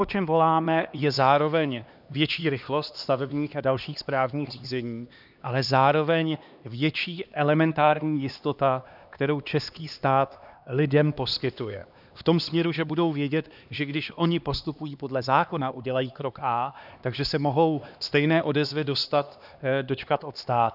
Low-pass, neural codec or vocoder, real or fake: 5.4 kHz; codec, 16 kHz, 2 kbps, X-Codec, HuBERT features, trained on LibriSpeech; fake